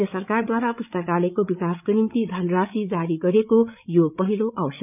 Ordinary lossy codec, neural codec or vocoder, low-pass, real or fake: none; codec, 16 kHz, 8 kbps, FreqCodec, larger model; 3.6 kHz; fake